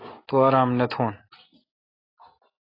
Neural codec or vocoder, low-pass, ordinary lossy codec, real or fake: none; 5.4 kHz; AAC, 24 kbps; real